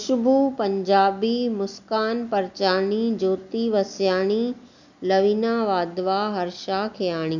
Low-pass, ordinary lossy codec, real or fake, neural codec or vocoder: 7.2 kHz; none; real; none